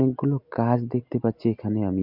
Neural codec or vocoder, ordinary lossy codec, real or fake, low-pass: none; MP3, 48 kbps; real; 5.4 kHz